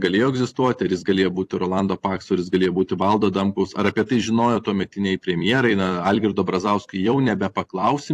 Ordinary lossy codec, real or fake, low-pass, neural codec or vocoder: AAC, 64 kbps; fake; 14.4 kHz; vocoder, 44.1 kHz, 128 mel bands every 256 samples, BigVGAN v2